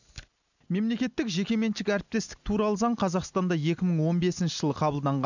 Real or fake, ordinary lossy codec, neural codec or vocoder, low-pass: real; none; none; 7.2 kHz